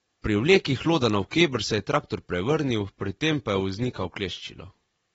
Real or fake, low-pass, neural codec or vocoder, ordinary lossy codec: real; 19.8 kHz; none; AAC, 24 kbps